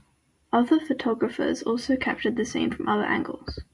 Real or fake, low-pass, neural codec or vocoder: real; 10.8 kHz; none